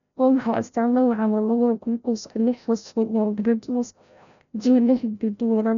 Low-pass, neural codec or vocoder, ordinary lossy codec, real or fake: 7.2 kHz; codec, 16 kHz, 0.5 kbps, FreqCodec, larger model; none; fake